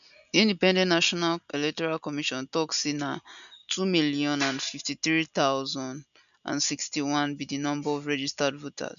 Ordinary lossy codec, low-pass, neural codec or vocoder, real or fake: none; 7.2 kHz; none; real